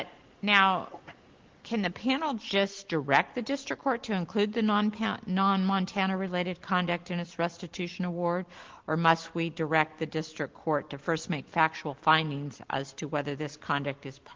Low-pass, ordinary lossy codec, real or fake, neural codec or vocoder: 7.2 kHz; Opus, 16 kbps; real; none